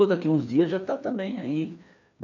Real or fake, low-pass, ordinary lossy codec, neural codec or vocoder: fake; 7.2 kHz; none; codec, 16 kHz, 2 kbps, FreqCodec, larger model